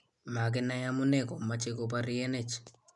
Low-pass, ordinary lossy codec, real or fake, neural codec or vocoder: 10.8 kHz; none; real; none